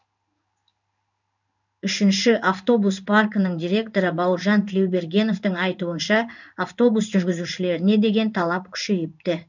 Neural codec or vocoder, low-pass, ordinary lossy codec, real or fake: codec, 16 kHz in and 24 kHz out, 1 kbps, XY-Tokenizer; 7.2 kHz; none; fake